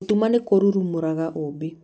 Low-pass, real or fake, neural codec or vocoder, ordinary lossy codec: none; real; none; none